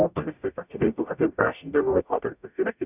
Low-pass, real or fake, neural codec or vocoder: 3.6 kHz; fake; codec, 44.1 kHz, 0.9 kbps, DAC